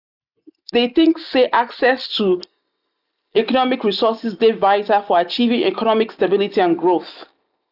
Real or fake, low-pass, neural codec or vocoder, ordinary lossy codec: real; 5.4 kHz; none; none